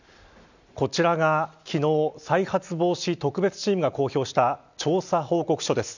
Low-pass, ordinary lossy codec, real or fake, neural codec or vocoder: 7.2 kHz; none; real; none